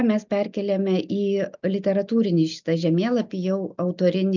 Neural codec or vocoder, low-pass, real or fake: none; 7.2 kHz; real